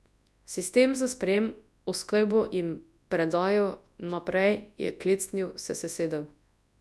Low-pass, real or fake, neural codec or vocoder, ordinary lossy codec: none; fake; codec, 24 kHz, 0.9 kbps, WavTokenizer, large speech release; none